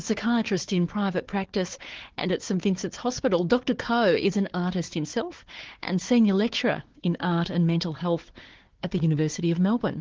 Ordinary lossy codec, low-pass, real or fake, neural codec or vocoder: Opus, 16 kbps; 7.2 kHz; fake; codec, 16 kHz, 4 kbps, FunCodec, trained on Chinese and English, 50 frames a second